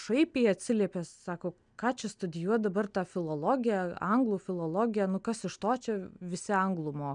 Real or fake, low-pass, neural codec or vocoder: real; 9.9 kHz; none